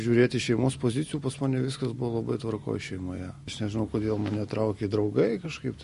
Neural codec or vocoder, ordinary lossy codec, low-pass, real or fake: none; MP3, 48 kbps; 14.4 kHz; real